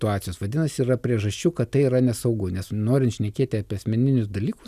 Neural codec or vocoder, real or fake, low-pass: none; real; 14.4 kHz